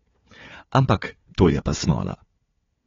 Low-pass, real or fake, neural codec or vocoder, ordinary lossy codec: 7.2 kHz; fake; codec, 16 kHz, 4 kbps, FunCodec, trained on Chinese and English, 50 frames a second; AAC, 24 kbps